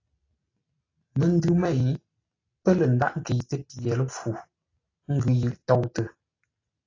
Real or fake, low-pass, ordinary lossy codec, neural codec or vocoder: real; 7.2 kHz; AAC, 32 kbps; none